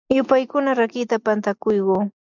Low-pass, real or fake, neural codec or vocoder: 7.2 kHz; real; none